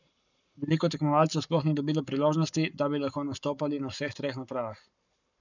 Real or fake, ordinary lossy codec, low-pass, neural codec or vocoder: fake; none; 7.2 kHz; codec, 44.1 kHz, 7.8 kbps, Pupu-Codec